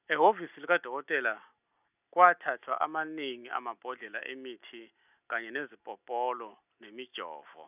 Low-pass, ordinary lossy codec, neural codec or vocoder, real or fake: 3.6 kHz; none; none; real